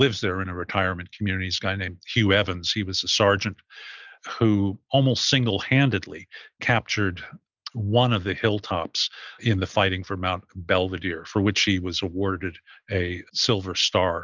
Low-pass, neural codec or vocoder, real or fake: 7.2 kHz; none; real